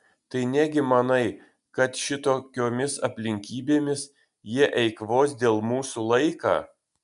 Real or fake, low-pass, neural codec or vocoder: real; 10.8 kHz; none